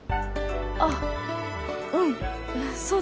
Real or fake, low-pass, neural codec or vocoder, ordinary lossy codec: real; none; none; none